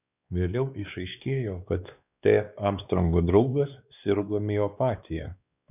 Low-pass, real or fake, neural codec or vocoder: 3.6 kHz; fake; codec, 16 kHz, 2 kbps, X-Codec, WavLM features, trained on Multilingual LibriSpeech